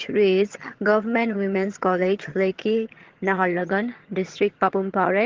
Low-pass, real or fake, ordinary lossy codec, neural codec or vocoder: 7.2 kHz; fake; Opus, 16 kbps; vocoder, 22.05 kHz, 80 mel bands, HiFi-GAN